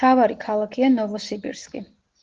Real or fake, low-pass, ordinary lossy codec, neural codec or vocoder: real; 7.2 kHz; Opus, 16 kbps; none